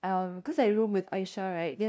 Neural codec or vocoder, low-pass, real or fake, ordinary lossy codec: codec, 16 kHz, 0.5 kbps, FunCodec, trained on LibriTTS, 25 frames a second; none; fake; none